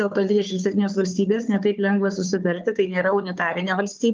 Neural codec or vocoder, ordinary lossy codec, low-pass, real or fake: codec, 16 kHz, 4 kbps, FunCodec, trained on Chinese and English, 50 frames a second; Opus, 32 kbps; 7.2 kHz; fake